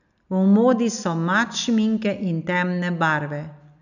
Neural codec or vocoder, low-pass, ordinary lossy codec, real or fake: none; 7.2 kHz; none; real